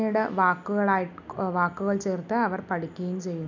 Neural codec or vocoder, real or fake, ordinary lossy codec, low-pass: none; real; none; 7.2 kHz